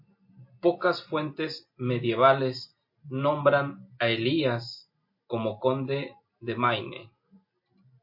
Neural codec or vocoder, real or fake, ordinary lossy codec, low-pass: none; real; MP3, 32 kbps; 5.4 kHz